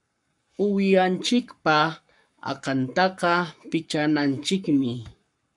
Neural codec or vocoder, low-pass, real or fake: codec, 44.1 kHz, 7.8 kbps, Pupu-Codec; 10.8 kHz; fake